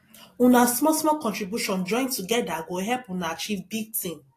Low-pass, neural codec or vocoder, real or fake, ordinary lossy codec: 14.4 kHz; none; real; AAC, 48 kbps